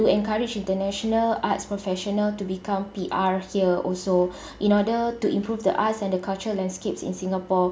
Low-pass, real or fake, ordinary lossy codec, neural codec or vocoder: none; real; none; none